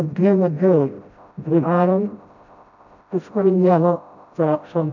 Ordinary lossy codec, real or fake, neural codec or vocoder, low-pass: none; fake; codec, 16 kHz, 0.5 kbps, FreqCodec, smaller model; 7.2 kHz